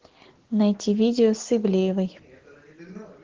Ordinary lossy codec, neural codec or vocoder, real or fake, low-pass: Opus, 16 kbps; none; real; 7.2 kHz